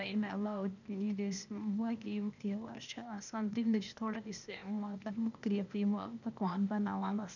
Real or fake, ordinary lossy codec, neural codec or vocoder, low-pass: fake; none; codec, 16 kHz, 0.8 kbps, ZipCodec; 7.2 kHz